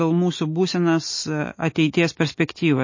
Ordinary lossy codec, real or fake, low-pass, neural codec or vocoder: MP3, 32 kbps; real; 7.2 kHz; none